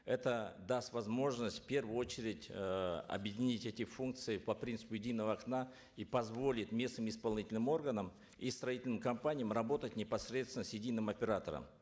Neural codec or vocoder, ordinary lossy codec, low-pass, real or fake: none; none; none; real